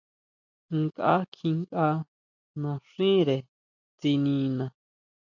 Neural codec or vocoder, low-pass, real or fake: none; 7.2 kHz; real